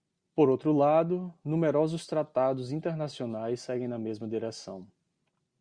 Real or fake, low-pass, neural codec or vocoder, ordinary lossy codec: real; 9.9 kHz; none; Opus, 64 kbps